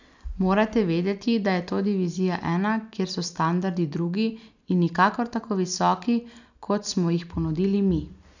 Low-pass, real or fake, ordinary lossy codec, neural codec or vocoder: 7.2 kHz; real; none; none